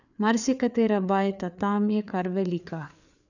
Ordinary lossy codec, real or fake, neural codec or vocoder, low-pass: none; fake; codec, 16 kHz, 4 kbps, FreqCodec, larger model; 7.2 kHz